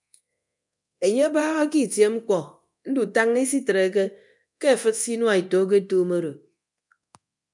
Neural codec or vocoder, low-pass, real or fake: codec, 24 kHz, 0.9 kbps, DualCodec; 10.8 kHz; fake